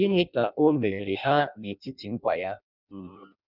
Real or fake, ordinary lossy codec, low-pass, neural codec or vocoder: fake; none; 5.4 kHz; codec, 16 kHz in and 24 kHz out, 0.6 kbps, FireRedTTS-2 codec